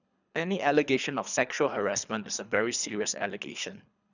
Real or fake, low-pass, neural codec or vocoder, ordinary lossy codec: fake; 7.2 kHz; codec, 24 kHz, 3 kbps, HILCodec; none